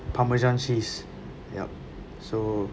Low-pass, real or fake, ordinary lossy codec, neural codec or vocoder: none; real; none; none